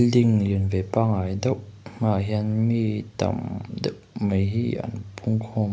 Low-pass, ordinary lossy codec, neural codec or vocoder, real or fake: none; none; none; real